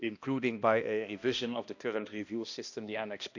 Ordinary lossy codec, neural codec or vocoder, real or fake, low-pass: none; codec, 16 kHz, 1 kbps, X-Codec, HuBERT features, trained on balanced general audio; fake; 7.2 kHz